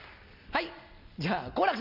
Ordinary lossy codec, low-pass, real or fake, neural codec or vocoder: Opus, 64 kbps; 5.4 kHz; real; none